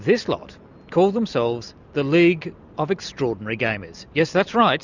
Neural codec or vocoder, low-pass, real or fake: none; 7.2 kHz; real